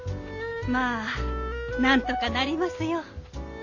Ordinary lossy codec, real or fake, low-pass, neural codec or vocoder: none; real; 7.2 kHz; none